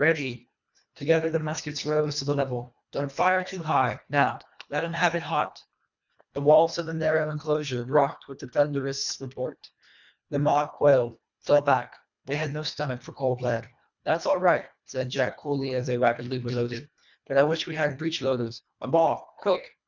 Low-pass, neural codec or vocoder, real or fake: 7.2 kHz; codec, 24 kHz, 1.5 kbps, HILCodec; fake